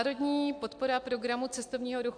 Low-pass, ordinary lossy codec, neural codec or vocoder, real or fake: 9.9 kHz; AAC, 64 kbps; none; real